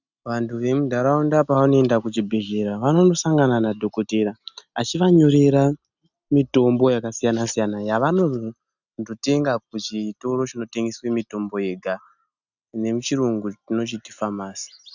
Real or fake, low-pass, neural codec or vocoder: real; 7.2 kHz; none